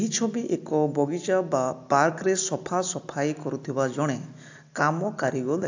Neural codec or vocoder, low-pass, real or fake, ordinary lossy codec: none; 7.2 kHz; real; AAC, 48 kbps